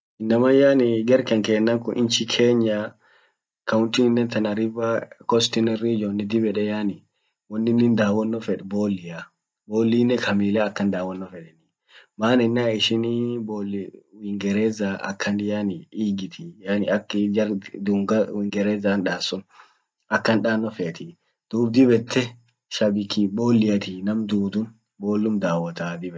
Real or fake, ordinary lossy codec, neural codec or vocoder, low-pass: real; none; none; none